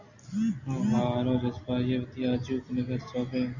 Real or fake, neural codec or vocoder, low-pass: real; none; 7.2 kHz